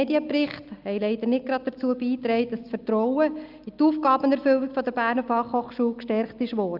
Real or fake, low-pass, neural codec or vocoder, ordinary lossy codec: real; 5.4 kHz; none; Opus, 32 kbps